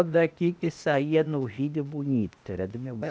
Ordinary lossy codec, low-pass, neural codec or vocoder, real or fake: none; none; codec, 16 kHz, 0.8 kbps, ZipCodec; fake